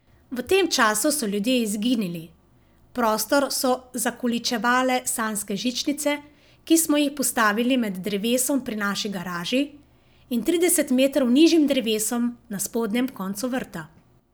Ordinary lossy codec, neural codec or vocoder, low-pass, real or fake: none; none; none; real